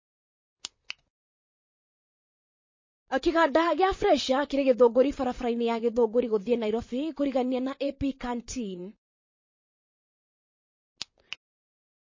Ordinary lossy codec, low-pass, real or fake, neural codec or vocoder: MP3, 32 kbps; 7.2 kHz; fake; vocoder, 44.1 kHz, 128 mel bands, Pupu-Vocoder